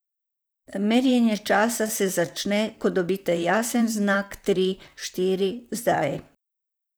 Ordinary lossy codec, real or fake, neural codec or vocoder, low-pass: none; fake; vocoder, 44.1 kHz, 128 mel bands, Pupu-Vocoder; none